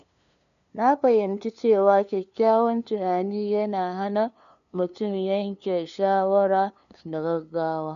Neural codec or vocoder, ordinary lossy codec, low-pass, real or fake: codec, 16 kHz, 2 kbps, FunCodec, trained on LibriTTS, 25 frames a second; none; 7.2 kHz; fake